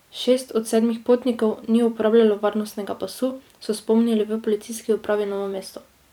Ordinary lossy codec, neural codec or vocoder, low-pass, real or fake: none; vocoder, 44.1 kHz, 128 mel bands every 256 samples, BigVGAN v2; 19.8 kHz; fake